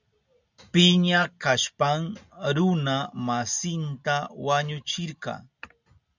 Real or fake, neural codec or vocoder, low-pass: real; none; 7.2 kHz